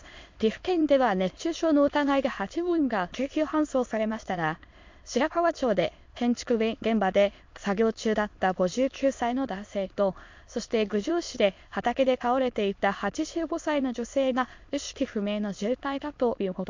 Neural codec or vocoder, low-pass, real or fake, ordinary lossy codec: autoencoder, 22.05 kHz, a latent of 192 numbers a frame, VITS, trained on many speakers; 7.2 kHz; fake; MP3, 48 kbps